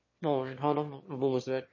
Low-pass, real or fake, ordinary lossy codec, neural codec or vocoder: 7.2 kHz; fake; MP3, 32 kbps; autoencoder, 22.05 kHz, a latent of 192 numbers a frame, VITS, trained on one speaker